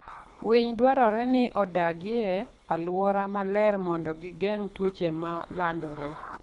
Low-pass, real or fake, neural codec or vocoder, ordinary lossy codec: 10.8 kHz; fake; codec, 24 kHz, 3 kbps, HILCodec; none